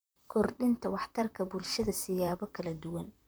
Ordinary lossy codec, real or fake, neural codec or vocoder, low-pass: none; fake; vocoder, 44.1 kHz, 128 mel bands, Pupu-Vocoder; none